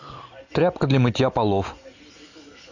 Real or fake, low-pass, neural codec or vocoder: real; 7.2 kHz; none